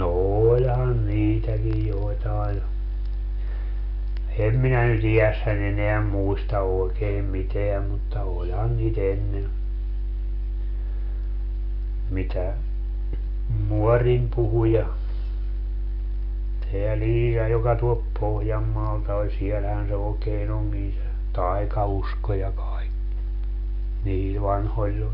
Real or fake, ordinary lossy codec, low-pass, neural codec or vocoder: real; none; 5.4 kHz; none